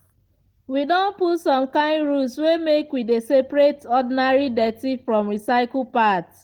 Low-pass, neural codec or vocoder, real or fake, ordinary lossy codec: 19.8 kHz; none; real; Opus, 16 kbps